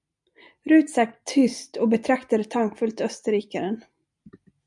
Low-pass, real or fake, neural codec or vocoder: 10.8 kHz; real; none